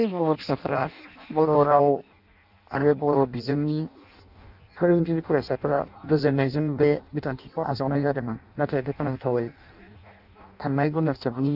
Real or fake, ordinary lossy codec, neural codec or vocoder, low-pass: fake; none; codec, 16 kHz in and 24 kHz out, 0.6 kbps, FireRedTTS-2 codec; 5.4 kHz